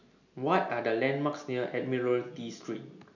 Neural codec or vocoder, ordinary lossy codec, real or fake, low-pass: none; none; real; 7.2 kHz